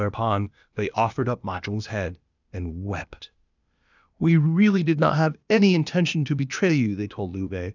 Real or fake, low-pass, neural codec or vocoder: fake; 7.2 kHz; codec, 16 kHz, 0.8 kbps, ZipCodec